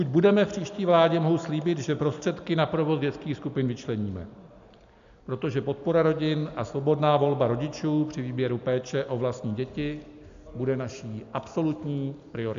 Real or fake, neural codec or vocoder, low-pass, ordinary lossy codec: real; none; 7.2 kHz; MP3, 64 kbps